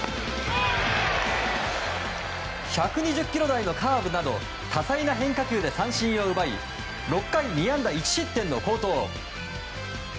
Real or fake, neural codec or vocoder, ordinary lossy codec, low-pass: real; none; none; none